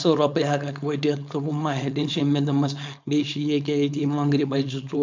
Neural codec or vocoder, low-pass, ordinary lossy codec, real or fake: codec, 16 kHz, 4.8 kbps, FACodec; 7.2 kHz; MP3, 64 kbps; fake